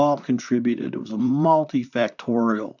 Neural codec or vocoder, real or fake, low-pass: vocoder, 44.1 kHz, 128 mel bands, Pupu-Vocoder; fake; 7.2 kHz